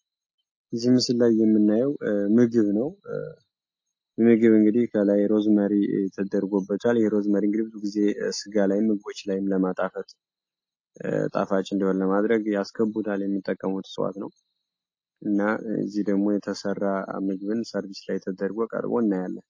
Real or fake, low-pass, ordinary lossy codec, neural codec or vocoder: real; 7.2 kHz; MP3, 32 kbps; none